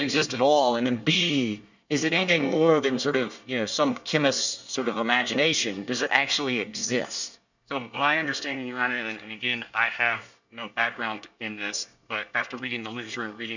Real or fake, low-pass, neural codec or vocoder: fake; 7.2 kHz; codec, 24 kHz, 1 kbps, SNAC